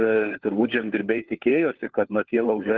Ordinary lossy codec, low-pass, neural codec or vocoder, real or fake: Opus, 16 kbps; 7.2 kHz; codec, 16 kHz, 4 kbps, FunCodec, trained on LibriTTS, 50 frames a second; fake